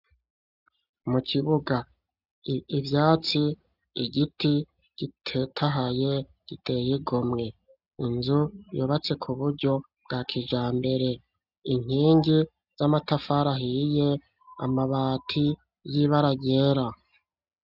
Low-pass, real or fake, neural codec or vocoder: 5.4 kHz; real; none